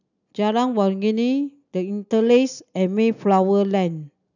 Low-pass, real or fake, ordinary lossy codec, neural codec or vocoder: 7.2 kHz; real; none; none